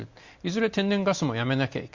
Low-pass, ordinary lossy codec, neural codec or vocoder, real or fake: 7.2 kHz; Opus, 64 kbps; none; real